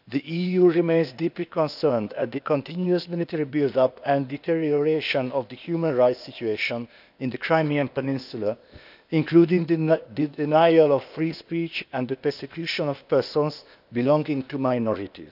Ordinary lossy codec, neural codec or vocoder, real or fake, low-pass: none; codec, 16 kHz, 0.8 kbps, ZipCodec; fake; 5.4 kHz